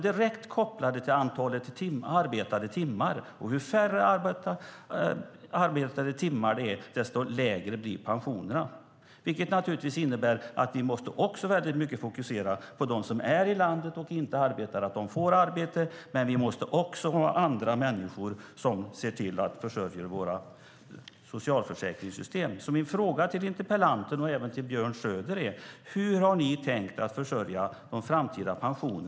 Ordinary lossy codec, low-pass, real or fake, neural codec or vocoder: none; none; real; none